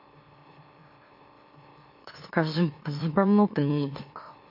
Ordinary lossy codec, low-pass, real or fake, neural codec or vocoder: MP3, 32 kbps; 5.4 kHz; fake; autoencoder, 44.1 kHz, a latent of 192 numbers a frame, MeloTTS